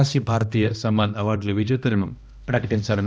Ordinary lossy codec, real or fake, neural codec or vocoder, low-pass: none; fake; codec, 16 kHz, 1 kbps, X-Codec, HuBERT features, trained on balanced general audio; none